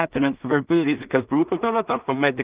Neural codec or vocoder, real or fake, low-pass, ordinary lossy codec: codec, 16 kHz in and 24 kHz out, 0.4 kbps, LongCat-Audio-Codec, two codebook decoder; fake; 3.6 kHz; Opus, 32 kbps